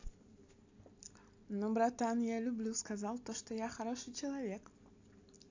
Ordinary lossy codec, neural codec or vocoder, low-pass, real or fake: AAC, 48 kbps; none; 7.2 kHz; real